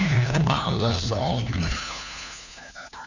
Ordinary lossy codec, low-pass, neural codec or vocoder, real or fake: none; 7.2 kHz; codec, 16 kHz, 1 kbps, FunCodec, trained on LibriTTS, 50 frames a second; fake